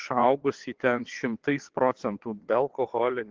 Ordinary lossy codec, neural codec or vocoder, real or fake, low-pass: Opus, 16 kbps; vocoder, 22.05 kHz, 80 mel bands, WaveNeXt; fake; 7.2 kHz